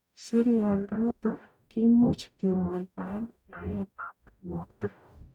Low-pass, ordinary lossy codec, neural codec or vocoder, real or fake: 19.8 kHz; none; codec, 44.1 kHz, 0.9 kbps, DAC; fake